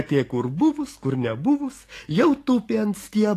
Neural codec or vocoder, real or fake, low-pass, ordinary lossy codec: codec, 44.1 kHz, 7.8 kbps, DAC; fake; 14.4 kHz; AAC, 48 kbps